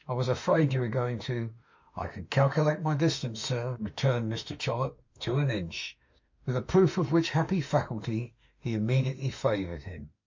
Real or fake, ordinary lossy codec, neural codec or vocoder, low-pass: fake; MP3, 48 kbps; autoencoder, 48 kHz, 32 numbers a frame, DAC-VAE, trained on Japanese speech; 7.2 kHz